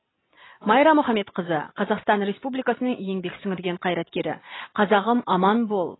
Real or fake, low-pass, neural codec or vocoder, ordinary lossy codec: real; 7.2 kHz; none; AAC, 16 kbps